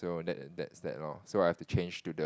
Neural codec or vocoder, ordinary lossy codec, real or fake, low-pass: none; none; real; none